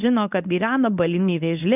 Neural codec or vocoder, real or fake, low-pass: codec, 24 kHz, 0.9 kbps, WavTokenizer, medium speech release version 1; fake; 3.6 kHz